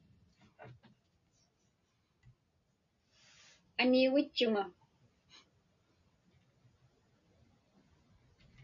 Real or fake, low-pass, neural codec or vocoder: real; 7.2 kHz; none